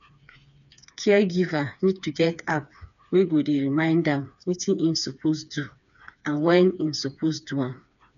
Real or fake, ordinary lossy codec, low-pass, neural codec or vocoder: fake; none; 7.2 kHz; codec, 16 kHz, 4 kbps, FreqCodec, smaller model